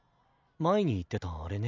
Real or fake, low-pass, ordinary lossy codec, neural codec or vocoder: real; 7.2 kHz; MP3, 64 kbps; none